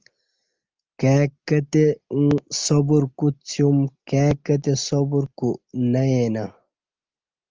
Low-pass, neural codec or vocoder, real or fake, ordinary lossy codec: 7.2 kHz; none; real; Opus, 24 kbps